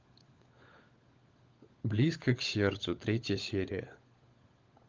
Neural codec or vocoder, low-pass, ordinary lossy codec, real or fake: codec, 16 kHz, 16 kbps, FunCodec, trained on LibriTTS, 50 frames a second; 7.2 kHz; Opus, 32 kbps; fake